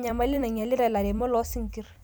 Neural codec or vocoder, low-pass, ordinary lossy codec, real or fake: vocoder, 44.1 kHz, 128 mel bands every 256 samples, BigVGAN v2; none; none; fake